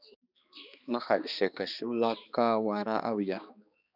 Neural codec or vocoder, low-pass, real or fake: codec, 16 kHz, 2 kbps, X-Codec, HuBERT features, trained on balanced general audio; 5.4 kHz; fake